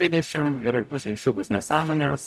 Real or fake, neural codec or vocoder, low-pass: fake; codec, 44.1 kHz, 0.9 kbps, DAC; 14.4 kHz